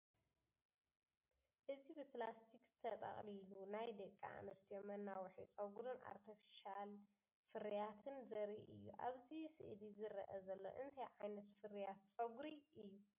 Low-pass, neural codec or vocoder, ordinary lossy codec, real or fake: 3.6 kHz; none; MP3, 32 kbps; real